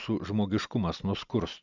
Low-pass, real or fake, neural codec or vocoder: 7.2 kHz; real; none